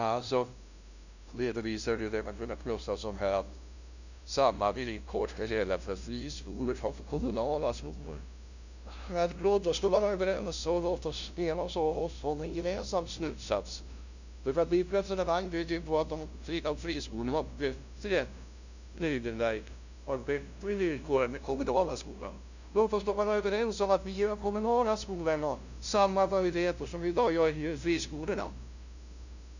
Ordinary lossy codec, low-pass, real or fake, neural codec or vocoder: none; 7.2 kHz; fake; codec, 16 kHz, 0.5 kbps, FunCodec, trained on LibriTTS, 25 frames a second